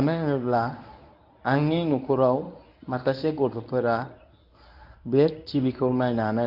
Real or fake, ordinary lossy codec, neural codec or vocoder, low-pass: fake; none; codec, 24 kHz, 0.9 kbps, WavTokenizer, medium speech release version 1; 5.4 kHz